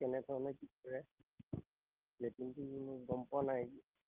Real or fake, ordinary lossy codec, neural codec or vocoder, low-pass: real; Opus, 24 kbps; none; 3.6 kHz